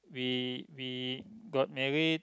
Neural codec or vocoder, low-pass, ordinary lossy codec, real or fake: none; none; none; real